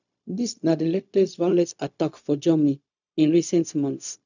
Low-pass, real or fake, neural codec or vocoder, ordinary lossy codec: 7.2 kHz; fake; codec, 16 kHz, 0.4 kbps, LongCat-Audio-Codec; none